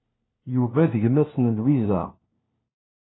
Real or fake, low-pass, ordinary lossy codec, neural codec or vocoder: fake; 7.2 kHz; AAC, 16 kbps; codec, 16 kHz, 1 kbps, FunCodec, trained on LibriTTS, 50 frames a second